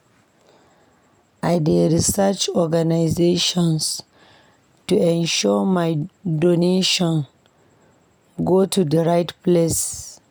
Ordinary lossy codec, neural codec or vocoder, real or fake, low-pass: none; none; real; none